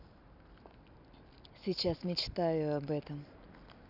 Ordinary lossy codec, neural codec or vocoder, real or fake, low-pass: none; none; real; 5.4 kHz